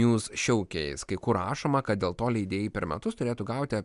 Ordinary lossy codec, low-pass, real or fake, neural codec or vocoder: MP3, 96 kbps; 10.8 kHz; real; none